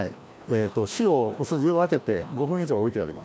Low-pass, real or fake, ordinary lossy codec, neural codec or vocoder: none; fake; none; codec, 16 kHz, 1 kbps, FreqCodec, larger model